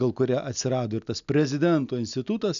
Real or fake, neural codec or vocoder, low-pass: real; none; 7.2 kHz